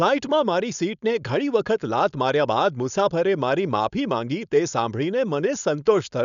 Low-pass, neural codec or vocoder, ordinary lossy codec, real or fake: 7.2 kHz; codec, 16 kHz, 4.8 kbps, FACodec; MP3, 96 kbps; fake